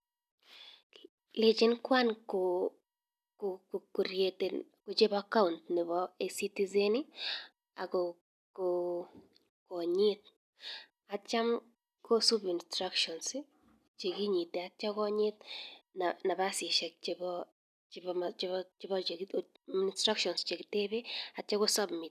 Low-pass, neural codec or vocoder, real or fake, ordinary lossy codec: 14.4 kHz; none; real; none